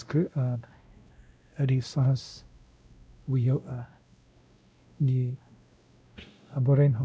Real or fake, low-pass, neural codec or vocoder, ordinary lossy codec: fake; none; codec, 16 kHz, 0.5 kbps, X-Codec, WavLM features, trained on Multilingual LibriSpeech; none